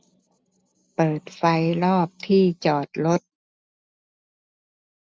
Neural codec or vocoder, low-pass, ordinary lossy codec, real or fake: none; none; none; real